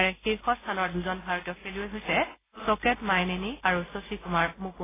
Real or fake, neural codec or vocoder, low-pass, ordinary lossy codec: real; none; 3.6 kHz; AAC, 16 kbps